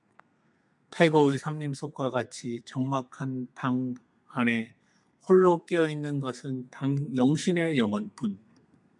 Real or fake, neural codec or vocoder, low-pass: fake; codec, 32 kHz, 1.9 kbps, SNAC; 10.8 kHz